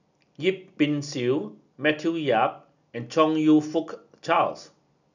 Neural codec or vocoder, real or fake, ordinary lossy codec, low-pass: none; real; none; 7.2 kHz